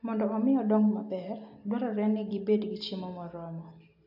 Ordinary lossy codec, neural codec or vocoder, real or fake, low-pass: AAC, 48 kbps; none; real; 5.4 kHz